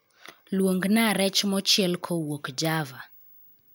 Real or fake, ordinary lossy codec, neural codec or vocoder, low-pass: real; none; none; none